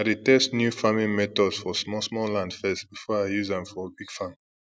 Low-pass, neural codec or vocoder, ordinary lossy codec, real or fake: none; none; none; real